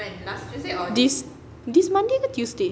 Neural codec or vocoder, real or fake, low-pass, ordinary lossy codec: none; real; none; none